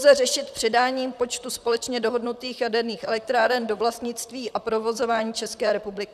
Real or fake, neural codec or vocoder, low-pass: fake; vocoder, 44.1 kHz, 128 mel bands, Pupu-Vocoder; 14.4 kHz